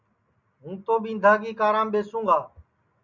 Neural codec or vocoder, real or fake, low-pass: none; real; 7.2 kHz